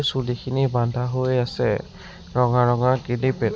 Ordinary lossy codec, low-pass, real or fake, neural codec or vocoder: none; none; real; none